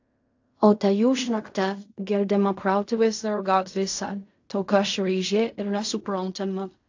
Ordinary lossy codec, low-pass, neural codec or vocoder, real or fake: AAC, 48 kbps; 7.2 kHz; codec, 16 kHz in and 24 kHz out, 0.4 kbps, LongCat-Audio-Codec, fine tuned four codebook decoder; fake